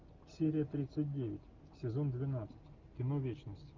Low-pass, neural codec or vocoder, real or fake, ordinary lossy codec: 7.2 kHz; none; real; Opus, 32 kbps